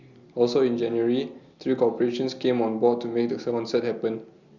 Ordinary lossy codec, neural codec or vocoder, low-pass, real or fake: Opus, 64 kbps; none; 7.2 kHz; real